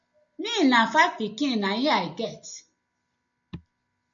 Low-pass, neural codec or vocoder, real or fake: 7.2 kHz; none; real